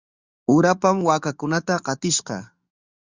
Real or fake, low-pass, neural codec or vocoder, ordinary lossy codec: fake; 7.2 kHz; codec, 44.1 kHz, 7.8 kbps, DAC; Opus, 64 kbps